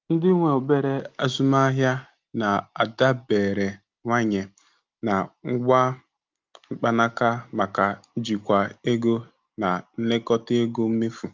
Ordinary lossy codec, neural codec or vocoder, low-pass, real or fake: Opus, 24 kbps; none; 7.2 kHz; real